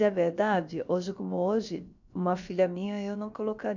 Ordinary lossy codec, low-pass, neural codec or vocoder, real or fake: none; 7.2 kHz; codec, 16 kHz, about 1 kbps, DyCAST, with the encoder's durations; fake